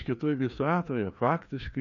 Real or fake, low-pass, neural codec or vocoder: fake; 7.2 kHz; codec, 16 kHz, 2 kbps, FreqCodec, larger model